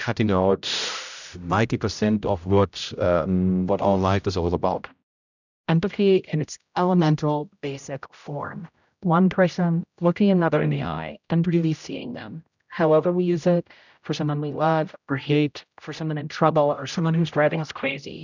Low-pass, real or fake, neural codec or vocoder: 7.2 kHz; fake; codec, 16 kHz, 0.5 kbps, X-Codec, HuBERT features, trained on general audio